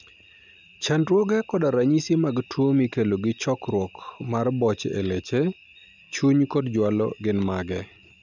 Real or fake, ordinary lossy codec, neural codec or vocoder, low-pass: real; none; none; 7.2 kHz